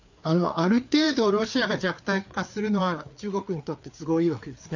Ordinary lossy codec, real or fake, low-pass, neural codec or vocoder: none; fake; 7.2 kHz; codec, 16 kHz, 4 kbps, FreqCodec, larger model